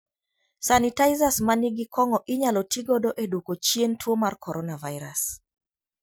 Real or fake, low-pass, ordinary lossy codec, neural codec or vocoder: real; none; none; none